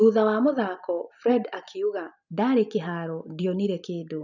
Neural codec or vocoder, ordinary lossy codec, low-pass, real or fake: none; none; 7.2 kHz; real